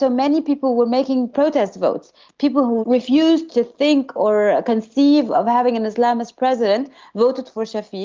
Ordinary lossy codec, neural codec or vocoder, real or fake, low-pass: Opus, 32 kbps; none; real; 7.2 kHz